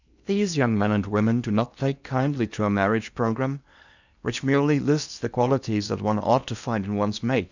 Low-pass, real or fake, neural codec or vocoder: 7.2 kHz; fake; codec, 16 kHz in and 24 kHz out, 0.8 kbps, FocalCodec, streaming, 65536 codes